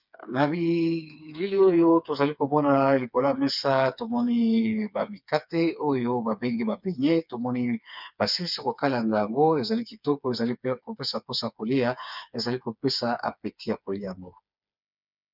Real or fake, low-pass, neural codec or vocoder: fake; 5.4 kHz; codec, 16 kHz, 4 kbps, FreqCodec, smaller model